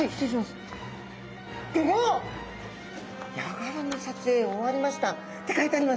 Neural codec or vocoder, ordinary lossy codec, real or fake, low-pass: none; none; real; none